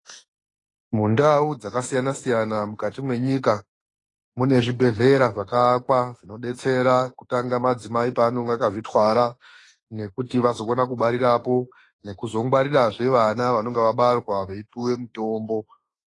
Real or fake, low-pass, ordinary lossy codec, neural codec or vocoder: fake; 10.8 kHz; AAC, 32 kbps; autoencoder, 48 kHz, 32 numbers a frame, DAC-VAE, trained on Japanese speech